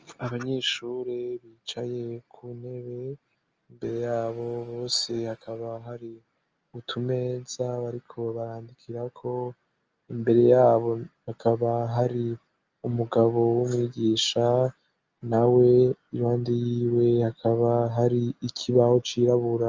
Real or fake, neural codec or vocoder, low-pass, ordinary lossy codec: real; none; 7.2 kHz; Opus, 24 kbps